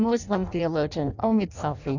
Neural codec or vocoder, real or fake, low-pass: codec, 16 kHz in and 24 kHz out, 0.6 kbps, FireRedTTS-2 codec; fake; 7.2 kHz